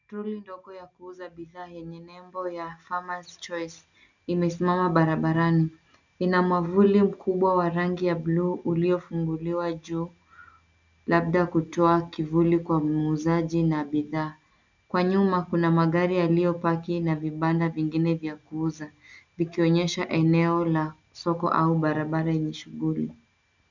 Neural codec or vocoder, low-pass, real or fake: none; 7.2 kHz; real